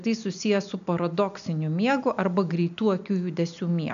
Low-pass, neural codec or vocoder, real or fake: 7.2 kHz; none; real